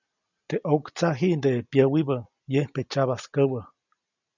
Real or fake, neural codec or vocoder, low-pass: real; none; 7.2 kHz